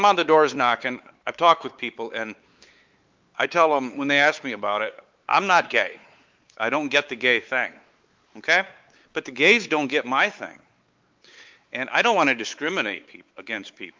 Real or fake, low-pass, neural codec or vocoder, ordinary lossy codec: fake; 7.2 kHz; codec, 16 kHz, 4 kbps, X-Codec, WavLM features, trained on Multilingual LibriSpeech; Opus, 24 kbps